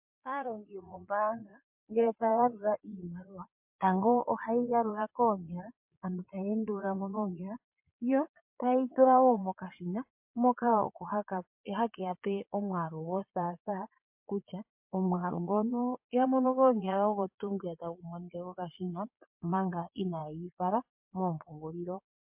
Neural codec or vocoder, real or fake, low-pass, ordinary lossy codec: vocoder, 22.05 kHz, 80 mel bands, Vocos; fake; 3.6 kHz; MP3, 32 kbps